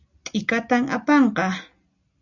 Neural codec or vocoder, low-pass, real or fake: none; 7.2 kHz; real